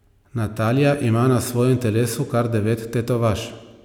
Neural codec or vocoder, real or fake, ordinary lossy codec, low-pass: none; real; none; 19.8 kHz